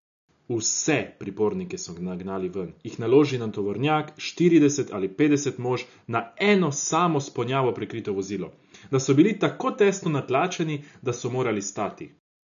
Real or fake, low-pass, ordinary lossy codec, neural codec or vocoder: real; 7.2 kHz; none; none